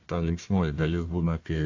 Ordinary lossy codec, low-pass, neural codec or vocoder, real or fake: AAC, 48 kbps; 7.2 kHz; codec, 16 kHz, 1 kbps, FunCodec, trained on Chinese and English, 50 frames a second; fake